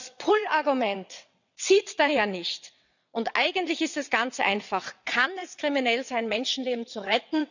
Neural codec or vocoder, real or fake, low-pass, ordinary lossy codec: vocoder, 22.05 kHz, 80 mel bands, WaveNeXt; fake; 7.2 kHz; none